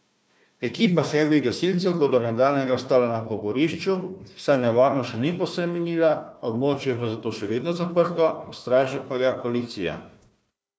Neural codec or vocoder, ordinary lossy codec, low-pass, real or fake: codec, 16 kHz, 1 kbps, FunCodec, trained on Chinese and English, 50 frames a second; none; none; fake